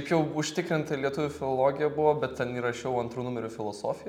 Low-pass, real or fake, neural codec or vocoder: 19.8 kHz; real; none